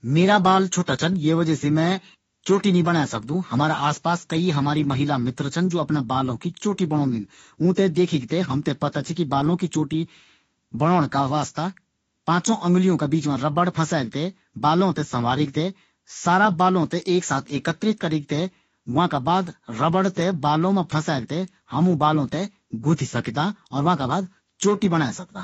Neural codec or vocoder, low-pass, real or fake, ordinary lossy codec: autoencoder, 48 kHz, 32 numbers a frame, DAC-VAE, trained on Japanese speech; 19.8 kHz; fake; AAC, 24 kbps